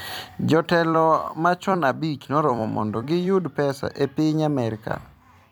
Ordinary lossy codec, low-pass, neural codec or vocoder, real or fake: none; none; vocoder, 44.1 kHz, 128 mel bands every 256 samples, BigVGAN v2; fake